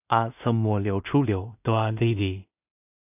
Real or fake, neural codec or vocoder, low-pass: fake; codec, 16 kHz in and 24 kHz out, 0.4 kbps, LongCat-Audio-Codec, two codebook decoder; 3.6 kHz